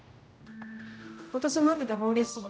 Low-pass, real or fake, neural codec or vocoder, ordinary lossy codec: none; fake; codec, 16 kHz, 0.5 kbps, X-Codec, HuBERT features, trained on general audio; none